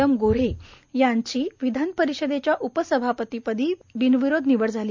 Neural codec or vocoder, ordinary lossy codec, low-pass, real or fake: none; MP3, 64 kbps; 7.2 kHz; real